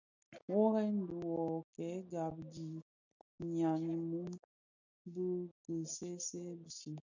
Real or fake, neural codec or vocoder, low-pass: real; none; 7.2 kHz